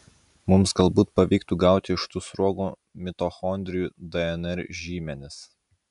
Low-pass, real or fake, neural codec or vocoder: 10.8 kHz; real; none